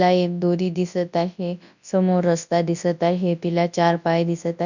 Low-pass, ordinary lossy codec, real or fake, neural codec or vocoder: 7.2 kHz; none; fake; codec, 24 kHz, 0.9 kbps, WavTokenizer, large speech release